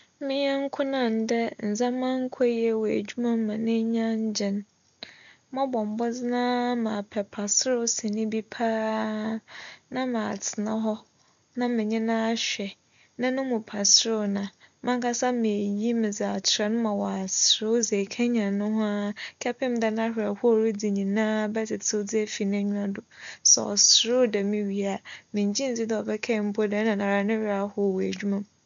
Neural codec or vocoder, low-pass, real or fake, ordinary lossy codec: none; 7.2 kHz; real; none